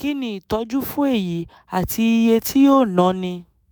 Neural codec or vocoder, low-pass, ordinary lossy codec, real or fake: autoencoder, 48 kHz, 128 numbers a frame, DAC-VAE, trained on Japanese speech; none; none; fake